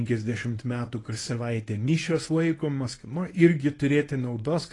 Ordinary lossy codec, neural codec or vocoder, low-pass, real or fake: AAC, 32 kbps; codec, 24 kHz, 0.9 kbps, WavTokenizer, medium speech release version 1; 10.8 kHz; fake